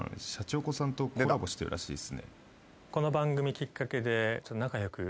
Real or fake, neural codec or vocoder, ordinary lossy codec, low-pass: real; none; none; none